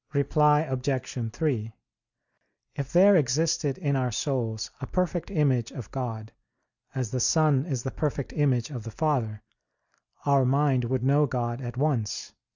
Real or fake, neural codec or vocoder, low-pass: real; none; 7.2 kHz